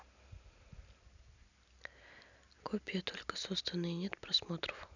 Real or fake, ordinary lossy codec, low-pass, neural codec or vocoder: real; none; 7.2 kHz; none